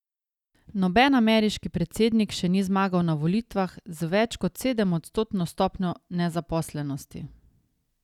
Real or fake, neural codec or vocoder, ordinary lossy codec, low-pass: real; none; none; 19.8 kHz